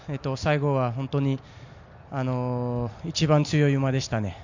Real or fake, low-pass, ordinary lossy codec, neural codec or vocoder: real; 7.2 kHz; MP3, 64 kbps; none